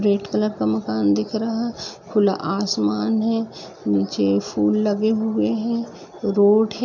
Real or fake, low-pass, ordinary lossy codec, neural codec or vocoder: real; 7.2 kHz; none; none